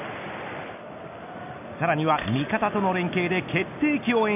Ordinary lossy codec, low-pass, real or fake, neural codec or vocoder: none; 3.6 kHz; real; none